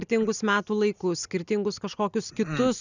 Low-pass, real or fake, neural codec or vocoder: 7.2 kHz; real; none